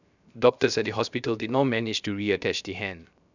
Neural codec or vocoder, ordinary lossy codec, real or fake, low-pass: codec, 16 kHz, 0.7 kbps, FocalCodec; none; fake; 7.2 kHz